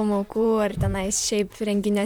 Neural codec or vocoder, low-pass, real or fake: vocoder, 44.1 kHz, 128 mel bands, Pupu-Vocoder; 19.8 kHz; fake